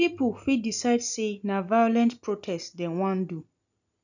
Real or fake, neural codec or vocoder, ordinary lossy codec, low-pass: real; none; none; 7.2 kHz